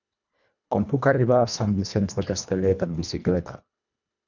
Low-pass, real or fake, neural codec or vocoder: 7.2 kHz; fake; codec, 24 kHz, 1.5 kbps, HILCodec